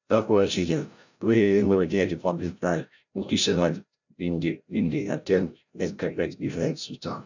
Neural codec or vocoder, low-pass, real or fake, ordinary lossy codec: codec, 16 kHz, 0.5 kbps, FreqCodec, larger model; 7.2 kHz; fake; none